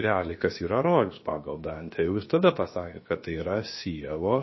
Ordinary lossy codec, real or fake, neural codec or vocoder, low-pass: MP3, 24 kbps; fake; codec, 16 kHz, about 1 kbps, DyCAST, with the encoder's durations; 7.2 kHz